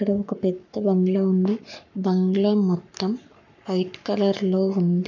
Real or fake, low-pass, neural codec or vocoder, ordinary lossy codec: fake; 7.2 kHz; codec, 44.1 kHz, 7.8 kbps, Pupu-Codec; none